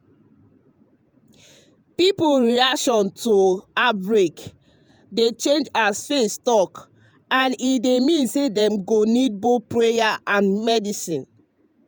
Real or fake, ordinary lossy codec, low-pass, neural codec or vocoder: fake; none; none; vocoder, 48 kHz, 128 mel bands, Vocos